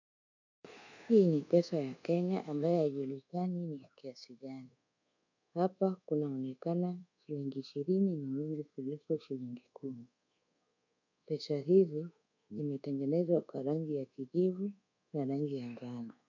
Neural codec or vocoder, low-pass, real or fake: codec, 24 kHz, 1.2 kbps, DualCodec; 7.2 kHz; fake